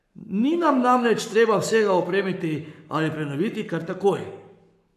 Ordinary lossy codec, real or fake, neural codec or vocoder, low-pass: none; fake; codec, 44.1 kHz, 7.8 kbps, Pupu-Codec; 14.4 kHz